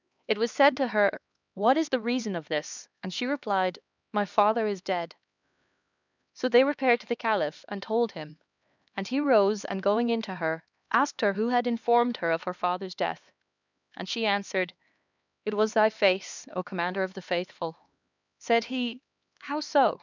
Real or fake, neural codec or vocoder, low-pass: fake; codec, 16 kHz, 2 kbps, X-Codec, HuBERT features, trained on LibriSpeech; 7.2 kHz